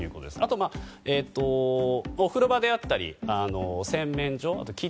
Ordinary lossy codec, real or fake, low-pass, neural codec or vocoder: none; real; none; none